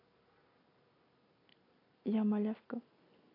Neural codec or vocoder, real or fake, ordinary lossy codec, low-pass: none; real; AAC, 24 kbps; 5.4 kHz